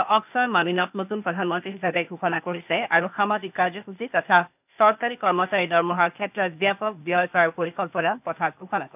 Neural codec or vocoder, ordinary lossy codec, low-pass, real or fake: codec, 16 kHz, 0.8 kbps, ZipCodec; none; 3.6 kHz; fake